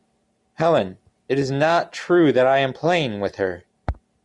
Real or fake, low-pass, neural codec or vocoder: real; 10.8 kHz; none